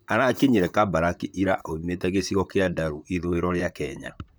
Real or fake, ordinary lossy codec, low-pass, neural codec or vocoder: fake; none; none; vocoder, 44.1 kHz, 128 mel bands, Pupu-Vocoder